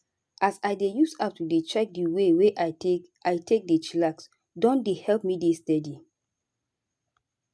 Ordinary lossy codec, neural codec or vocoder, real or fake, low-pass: none; none; real; none